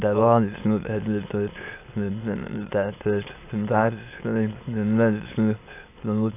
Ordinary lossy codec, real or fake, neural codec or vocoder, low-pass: AAC, 24 kbps; fake; autoencoder, 22.05 kHz, a latent of 192 numbers a frame, VITS, trained on many speakers; 3.6 kHz